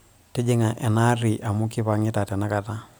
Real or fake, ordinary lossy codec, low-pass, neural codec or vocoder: real; none; none; none